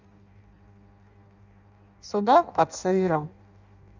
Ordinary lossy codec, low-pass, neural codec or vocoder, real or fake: none; 7.2 kHz; codec, 16 kHz in and 24 kHz out, 0.6 kbps, FireRedTTS-2 codec; fake